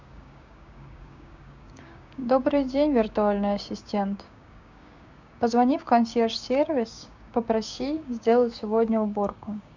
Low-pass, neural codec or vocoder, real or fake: 7.2 kHz; codec, 16 kHz, 6 kbps, DAC; fake